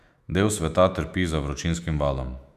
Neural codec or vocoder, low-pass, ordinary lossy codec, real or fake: autoencoder, 48 kHz, 128 numbers a frame, DAC-VAE, trained on Japanese speech; 14.4 kHz; none; fake